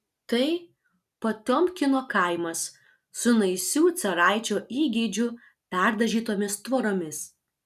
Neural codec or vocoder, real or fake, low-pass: none; real; 14.4 kHz